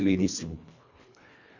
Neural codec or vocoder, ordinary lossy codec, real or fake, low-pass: codec, 24 kHz, 1.5 kbps, HILCodec; none; fake; 7.2 kHz